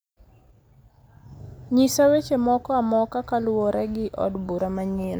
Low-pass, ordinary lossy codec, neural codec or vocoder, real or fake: none; none; none; real